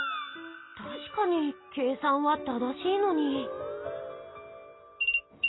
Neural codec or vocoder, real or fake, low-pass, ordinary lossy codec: none; real; 7.2 kHz; AAC, 16 kbps